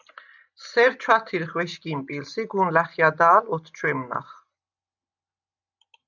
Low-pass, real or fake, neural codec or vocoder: 7.2 kHz; real; none